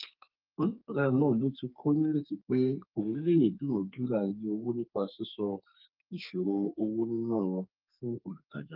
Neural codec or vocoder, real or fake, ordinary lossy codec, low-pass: codec, 32 kHz, 1.9 kbps, SNAC; fake; Opus, 24 kbps; 5.4 kHz